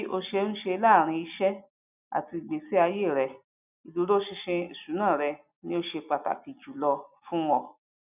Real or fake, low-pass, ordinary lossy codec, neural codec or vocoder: real; 3.6 kHz; none; none